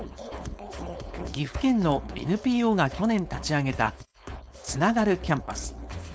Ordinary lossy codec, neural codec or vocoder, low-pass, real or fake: none; codec, 16 kHz, 4.8 kbps, FACodec; none; fake